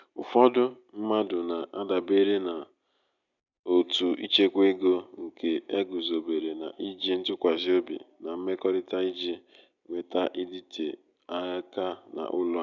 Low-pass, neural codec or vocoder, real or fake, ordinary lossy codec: 7.2 kHz; none; real; none